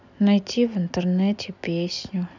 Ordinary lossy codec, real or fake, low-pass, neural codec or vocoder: none; real; 7.2 kHz; none